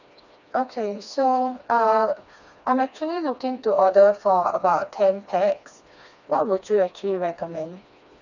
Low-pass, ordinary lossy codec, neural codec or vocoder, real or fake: 7.2 kHz; none; codec, 16 kHz, 2 kbps, FreqCodec, smaller model; fake